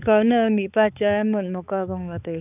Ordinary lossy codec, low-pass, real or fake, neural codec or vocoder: none; 3.6 kHz; fake; codec, 16 kHz, 4 kbps, X-Codec, HuBERT features, trained on balanced general audio